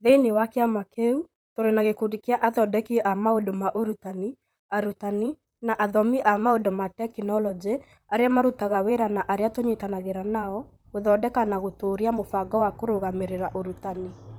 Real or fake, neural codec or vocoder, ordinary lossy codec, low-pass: fake; vocoder, 44.1 kHz, 128 mel bands, Pupu-Vocoder; none; none